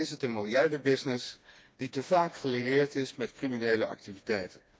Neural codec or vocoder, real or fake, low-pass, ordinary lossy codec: codec, 16 kHz, 2 kbps, FreqCodec, smaller model; fake; none; none